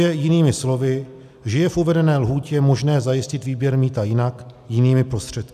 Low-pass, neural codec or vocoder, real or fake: 14.4 kHz; none; real